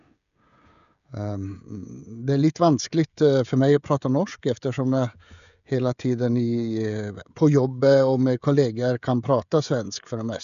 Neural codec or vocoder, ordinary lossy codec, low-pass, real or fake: codec, 16 kHz, 16 kbps, FreqCodec, smaller model; none; 7.2 kHz; fake